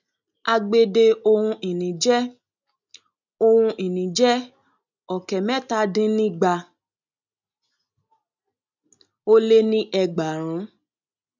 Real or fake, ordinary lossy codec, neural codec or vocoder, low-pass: real; none; none; 7.2 kHz